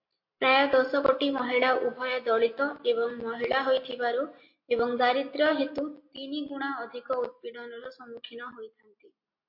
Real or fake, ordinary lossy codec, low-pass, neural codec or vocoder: real; MP3, 32 kbps; 5.4 kHz; none